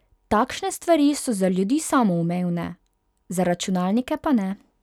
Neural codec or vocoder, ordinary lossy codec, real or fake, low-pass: none; none; real; 19.8 kHz